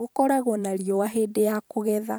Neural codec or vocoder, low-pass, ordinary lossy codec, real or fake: vocoder, 44.1 kHz, 128 mel bands every 512 samples, BigVGAN v2; none; none; fake